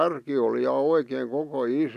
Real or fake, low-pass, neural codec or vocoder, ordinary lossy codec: real; 14.4 kHz; none; none